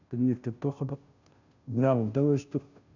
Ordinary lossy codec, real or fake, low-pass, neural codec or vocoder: none; fake; 7.2 kHz; codec, 16 kHz, 0.5 kbps, FunCodec, trained on Chinese and English, 25 frames a second